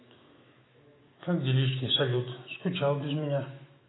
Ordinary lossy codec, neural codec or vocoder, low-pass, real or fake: AAC, 16 kbps; autoencoder, 48 kHz, 128 numbers a frame, DAC-VAE, trained on Japanese speech; 7.2 kHz; fake